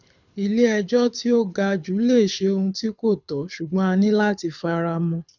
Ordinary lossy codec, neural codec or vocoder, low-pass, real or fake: none; codec, 24 kHz, 6 kbps, HILCodec; 7.2 kHz; fake